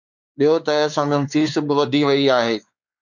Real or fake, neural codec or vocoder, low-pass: fake; codec, 16 kHz, 4 kbps, X-Codec, WavLM features, trained on Multilingual LibriSpeech; 7.2 kHz